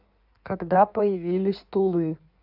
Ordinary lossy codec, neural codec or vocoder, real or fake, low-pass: none; codec, 16 kHz in and 24 kHz out, 1.1 kbps, FireRedTTS-2 codec; fake; 5.4 kHz